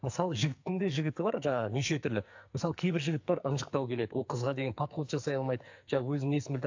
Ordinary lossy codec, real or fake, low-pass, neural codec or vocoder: AAC, 48 kbps; fake; 7.2 kHz; codec, 44.1 kHz, 2.6 kbps, SNAC